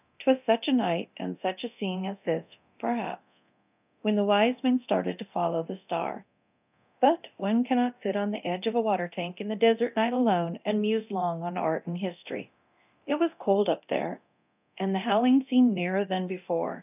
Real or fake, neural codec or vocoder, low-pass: fake; codec, 24 kHz, 0.9 kbps, DualCodec; 3.6 kHz